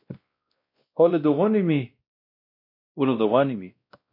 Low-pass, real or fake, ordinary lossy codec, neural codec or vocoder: 5.4 kHz; fake; MP3, 32 kbps; codec, 16 kHz, 1 kbps, X-Codec, WavLM features, trained on Multilingual LibriSpeech